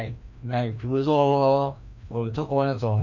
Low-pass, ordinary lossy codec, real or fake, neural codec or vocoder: 7.2 kHz; none; fake; codec, 16 kHz, 1 kbps, FreqCodec, larger model